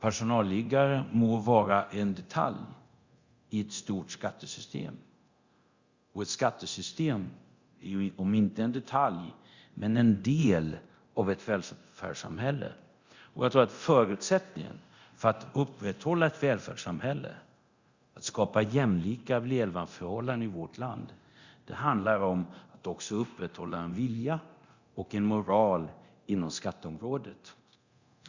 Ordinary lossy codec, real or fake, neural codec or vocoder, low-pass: Opus, 64 kbps; fake; codec, 24 kHz, 0.9 kbps, DualCodec; 7.2 kHz